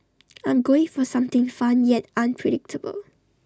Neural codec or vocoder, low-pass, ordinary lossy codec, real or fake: none; none; none; real